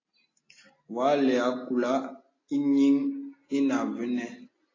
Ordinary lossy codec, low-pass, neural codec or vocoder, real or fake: AAC, 32 kbps; 7.2 kHz; none; real